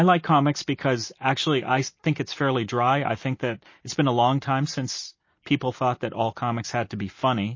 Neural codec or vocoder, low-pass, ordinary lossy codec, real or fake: none; 7.2 kHz; MP3, 32 kbps; real